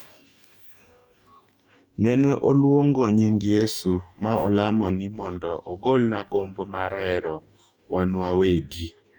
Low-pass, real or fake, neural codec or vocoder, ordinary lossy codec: 19.8 kHz; fake; codec, 44.1 kHz, 2.6 kbps, DAC; none